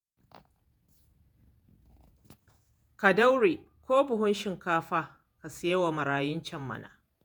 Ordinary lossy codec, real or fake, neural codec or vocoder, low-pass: none; fake; vocoder, 48 kHz, 128 mel bands, Vocos; none